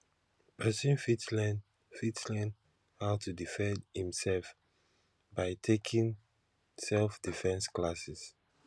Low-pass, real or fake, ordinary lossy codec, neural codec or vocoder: none; real; none; none